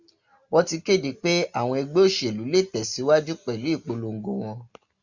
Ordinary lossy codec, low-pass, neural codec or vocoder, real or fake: Opus, 64 kbps; 7.2 kHz; none; real